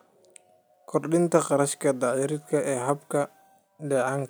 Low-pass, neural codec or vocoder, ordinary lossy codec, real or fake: none; none; none; real